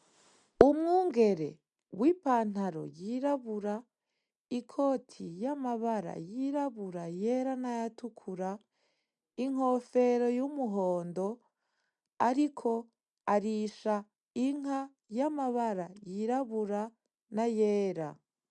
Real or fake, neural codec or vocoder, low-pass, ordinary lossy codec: real; none; 10.8 kHz; MP3, 96 kbps